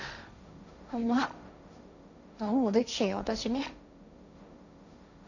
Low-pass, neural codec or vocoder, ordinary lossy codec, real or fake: 7.2 kHz; codec, 16 kHz, 1.1 kbps, Voila-Tokenizer; none; fake